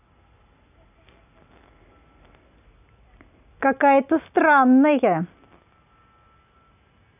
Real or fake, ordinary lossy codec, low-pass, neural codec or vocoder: real; none; 3.6 kHz; none